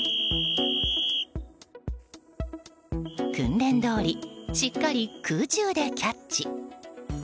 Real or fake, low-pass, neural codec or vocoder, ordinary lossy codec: real; none; none; none